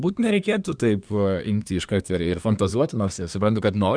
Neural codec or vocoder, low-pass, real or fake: codec, 24 kHz, 1 kbps, SNAC; 9.9 kHz; fake